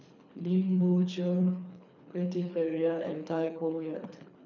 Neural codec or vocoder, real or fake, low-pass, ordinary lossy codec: codec, 24 kHz, 3 kbps, HILCodec; fake; 7.2 kHz; none